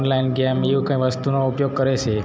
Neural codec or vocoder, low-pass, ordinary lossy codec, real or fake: none; none; none; real